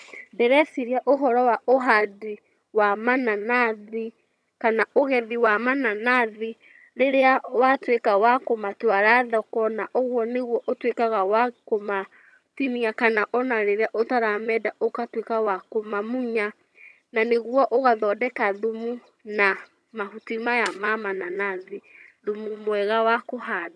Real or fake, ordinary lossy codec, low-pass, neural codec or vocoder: fake; none; none; vocoder, 22.05 kHz, 80 mel bands, HiFi-GAN